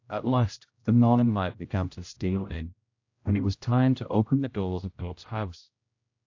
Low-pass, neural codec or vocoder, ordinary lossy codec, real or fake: 7.2 kHz; codec, 16 kHz, 0.5 kbps, X-Codec, HuBERT features, trained on general audio; AAC, 48 kbps; fake